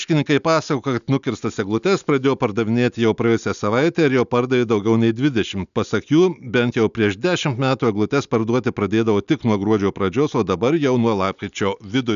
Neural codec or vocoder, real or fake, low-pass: none; real; 7.2 kHz